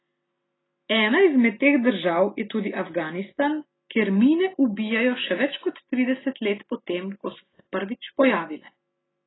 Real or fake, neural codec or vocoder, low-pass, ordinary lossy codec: real; none; 7.2 kHz; AAC, 16 kbps